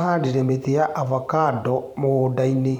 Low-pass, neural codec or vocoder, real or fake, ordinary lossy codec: 19.8 kHz; vocoder, 44.1 kHz, 128 mel bands every 512 samples, BigVGAN v2; fake; none